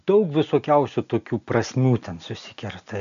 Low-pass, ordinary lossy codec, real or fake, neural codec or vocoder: 7.2 kHz; AAC, 96 kbps; real; none